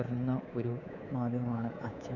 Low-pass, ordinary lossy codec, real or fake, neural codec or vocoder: 7.2 kHz; none; fake; codec, 24 kHz, 3.1 kbps, DualCodec